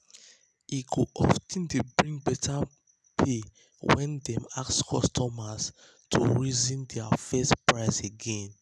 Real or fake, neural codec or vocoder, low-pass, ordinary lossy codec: real; none; none; none